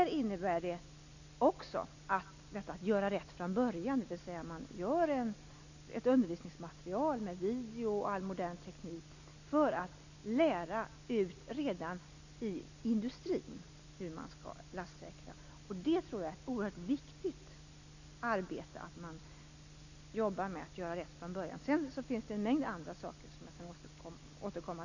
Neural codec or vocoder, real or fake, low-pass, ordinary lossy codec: none; real; 7.2 kHz; none